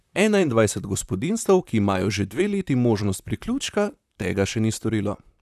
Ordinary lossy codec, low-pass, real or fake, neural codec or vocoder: none; 14.4 kHz; fake; vocoder, 44.1 kHz, 128 mel bands, Pupu-Vocoder